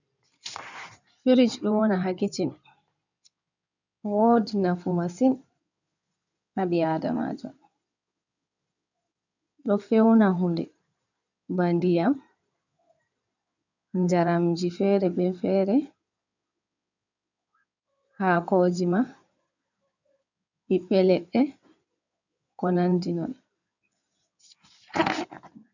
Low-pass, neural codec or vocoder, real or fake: 7.2 kHz; codec, 16 kHz in and 24 kHz out, 2.2 kbps, FireRedTTS-2 codec; fake